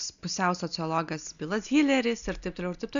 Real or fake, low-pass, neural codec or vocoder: real; 7.2 kHz; none